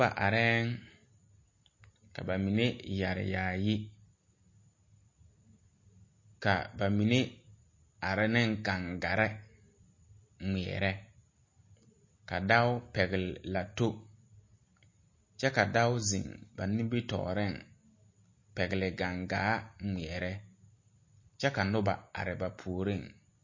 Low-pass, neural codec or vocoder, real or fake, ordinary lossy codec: 7.2 kHz; none; real; MP3, 32 kbps